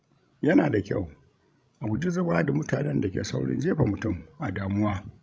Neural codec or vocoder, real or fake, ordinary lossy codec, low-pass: codec, 16 kHz, 16 kbps, FreqCodec, larger model; fake; none; none